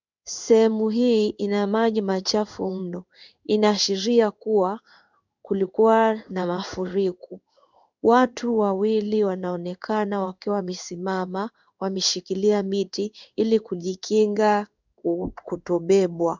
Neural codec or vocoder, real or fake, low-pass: codec, 16 kHz in and 24 kHz out, 1 kbps, XY-Tokenizer; fake; 7.2 kHz